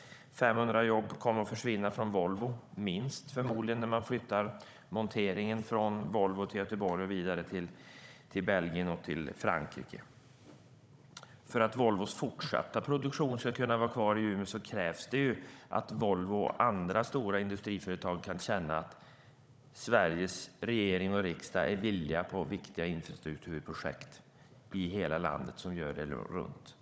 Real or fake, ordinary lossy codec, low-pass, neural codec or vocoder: fake; none; none; codec, 16 kHz, 16 kbps, FunCodec, trained on Chinese and English, 50 frames a second